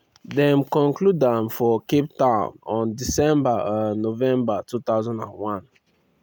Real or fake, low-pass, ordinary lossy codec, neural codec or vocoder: real; none; none; none